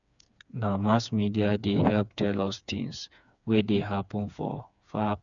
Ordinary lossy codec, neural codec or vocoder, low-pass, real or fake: none; codec, 16 kHz, 4 kbps, FreqCodec, smaller model; 7.2 kHz; fake